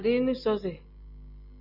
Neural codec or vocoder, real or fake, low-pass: none; real; 5.4 kHz